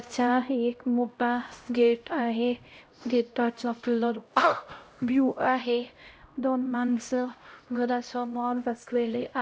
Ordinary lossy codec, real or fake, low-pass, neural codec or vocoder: none; fake; none; codec, 16 kHz, 0.5 kbps, X-Codec, HuBERT features, trained on LibriSpeech